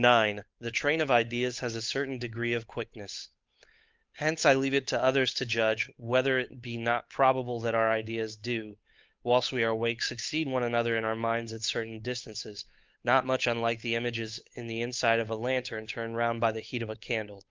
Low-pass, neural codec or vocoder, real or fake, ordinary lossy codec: 7.2 kHz; codec, 16 kHz, 8 kbps, FunCodec, trained on Chinese and English, 25 frames a second; fake; Opus, 24 kbps